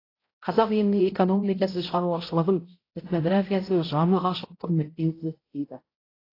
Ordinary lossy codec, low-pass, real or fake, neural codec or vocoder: AAC, 24 kbps; 5.4 kHz; fake; codec, 16 kHz, 0.5 kbps, X-Codec, HuBERT features, trained on balanced general audio